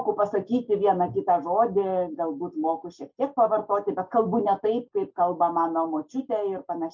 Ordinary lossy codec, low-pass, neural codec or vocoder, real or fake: MP3, 64 kbps; 7.2 kHz; none; real